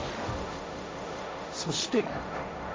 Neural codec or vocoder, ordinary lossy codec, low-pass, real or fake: codec, 16 kHz, 1.1 kbps, Voila-Tokenizer; none; none; fake